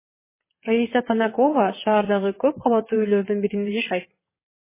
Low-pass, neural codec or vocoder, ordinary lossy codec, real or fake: 3.6 kHz; vocoder, 22.05 kHz, 80 mel bands, WaveNeXt; MP3, 16 kbps; fake